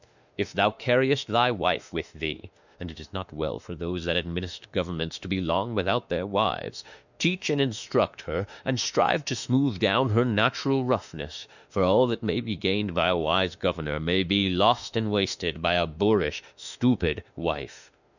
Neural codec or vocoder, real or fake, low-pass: autoencoder, 48 kHz, 32 numbers a frame, DAC-VAE, trained on Japanese speech; fake; 7.2 kHz